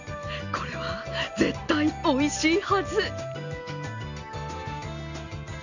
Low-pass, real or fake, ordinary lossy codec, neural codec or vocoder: 7.2 kHz; real; none; none